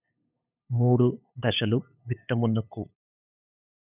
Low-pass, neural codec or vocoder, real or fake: 3.6 kHz; codec, 16 kHz, 8 kbps, FunCodec, trained on LibriTTS, 25 frames a second; fake